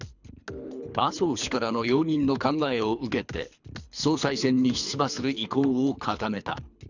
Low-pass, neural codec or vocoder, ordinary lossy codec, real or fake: 7.2 kHz; codec, 24 kHz, 3 kbps, HILCodec; none; fake